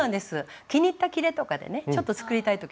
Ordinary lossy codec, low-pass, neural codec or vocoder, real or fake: none; none; none; real